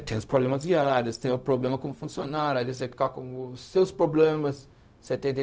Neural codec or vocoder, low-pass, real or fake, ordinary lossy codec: codec, 16 kHz, 0.4 kbps, LongCat-Audio-Codec; none; fake; none